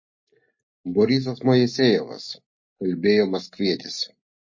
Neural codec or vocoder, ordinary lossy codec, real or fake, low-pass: none; MP3, 32 kbps; real; 7.2 kHz